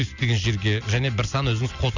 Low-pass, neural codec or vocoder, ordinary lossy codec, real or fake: 7.2 kHz; none; none; real